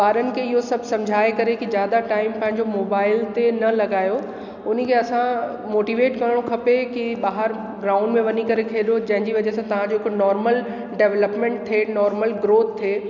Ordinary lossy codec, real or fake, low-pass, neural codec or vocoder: none; real; 7.2 kHz; none